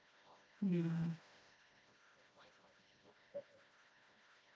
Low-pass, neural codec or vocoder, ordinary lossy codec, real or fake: none; codec, 16 kHz, 1 kbps, FreqCodec, smaller model; none; fake